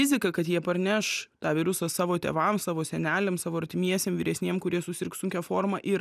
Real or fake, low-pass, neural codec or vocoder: real; 14.4 kHz; none